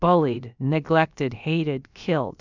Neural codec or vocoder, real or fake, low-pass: codec, 16 kHz, about 1 kbps, DyCAST, with the encoder's durations; fake; 7.2 kHz